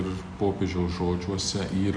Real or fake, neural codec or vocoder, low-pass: real; none; 9.9 kHz